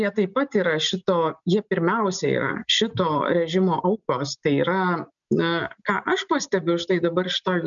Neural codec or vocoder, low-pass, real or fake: none; 7.2 kHz; real